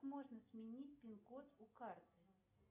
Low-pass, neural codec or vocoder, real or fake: 3.6 kHz; none; real